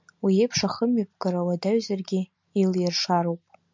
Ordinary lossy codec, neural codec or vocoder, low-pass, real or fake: MP3, 64 kbps; none; 7.2 kHz; real